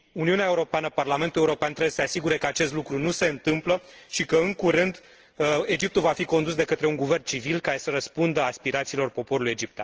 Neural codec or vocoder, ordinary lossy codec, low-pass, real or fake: none; Opus, 16 kbps; 7.2 kHz; real